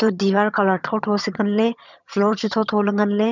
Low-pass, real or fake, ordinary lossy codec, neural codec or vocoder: 7.2 kHz; fake; none; vocoder, 22.05 kHz, 80 mel bands, HiFi-GAN